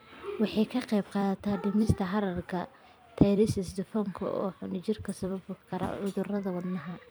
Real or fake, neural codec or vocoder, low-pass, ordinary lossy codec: fake; vocoder, 44.1 kHz, 128 mel bands every 256 samples, BigVGAN v2; none; none